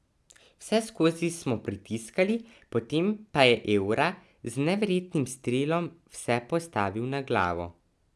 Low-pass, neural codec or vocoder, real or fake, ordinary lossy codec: none; none; real; none